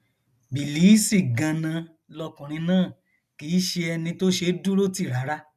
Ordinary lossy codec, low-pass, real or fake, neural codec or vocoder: none; 14.4 kHz; real; none